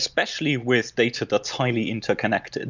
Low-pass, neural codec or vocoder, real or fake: 7.2 kHz; none; real